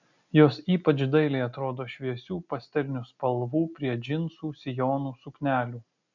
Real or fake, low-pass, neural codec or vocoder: real; 7.2 kHz; none